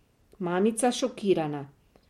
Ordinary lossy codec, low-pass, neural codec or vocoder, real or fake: MP3, 64 kbps; 19.8 kHz; none; real